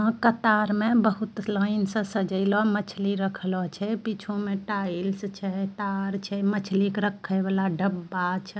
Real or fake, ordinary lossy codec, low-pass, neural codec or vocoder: real; none; none; none